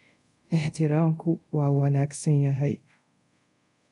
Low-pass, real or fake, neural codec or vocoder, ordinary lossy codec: 10.8 kHz; fake; codec, 24 kHz, 0.5 kbps, DualCodec; none